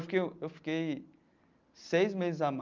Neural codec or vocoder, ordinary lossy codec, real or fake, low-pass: none; Opus, 24 kbps; real; 7.2 kHz